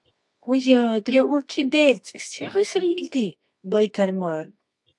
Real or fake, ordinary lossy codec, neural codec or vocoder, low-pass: fake; AAC, 64 kbps; codec, 24 kHz, 0.9 kbps, WavTokenizer, medium music audio release; 10.8 kHz